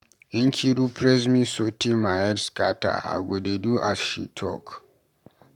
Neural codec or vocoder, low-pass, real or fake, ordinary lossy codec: codec, 44.1 kHz, 7.8 kbps, Pupu-Codec; 19.8 kHz; fake; none